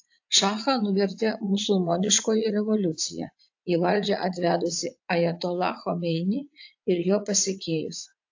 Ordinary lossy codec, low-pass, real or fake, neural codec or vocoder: AAC, 48 kbps; 7.2 kHz; fake; vocoder, 44.1 kHz, 80 mel bands, Vocos